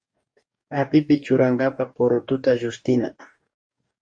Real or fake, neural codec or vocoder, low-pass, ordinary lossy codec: fake; codec, 44.1 kHz, 2.6 kbps, DAC; 9.9 kHz; MP3, 48 kbps